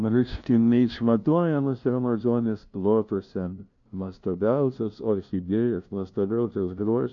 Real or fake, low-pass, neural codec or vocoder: fake; 7.2 kHz; codec, 16 kHz, 0.5 kbps, FunCodec, trained on LibriTTS, 25 frames a second